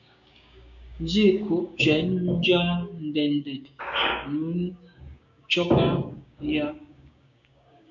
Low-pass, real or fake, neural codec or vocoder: 7.2 kHz; fake; codec, 16 kHz, 6 kbps, DAC